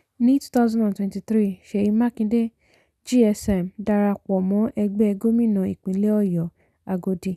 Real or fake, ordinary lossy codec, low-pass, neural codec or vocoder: real; none; 14.4 kHz; none